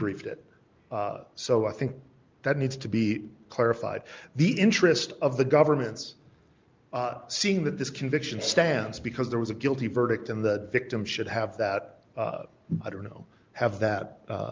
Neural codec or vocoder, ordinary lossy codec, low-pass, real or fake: none; Opus, 24 kbps; 7.2 kHz; real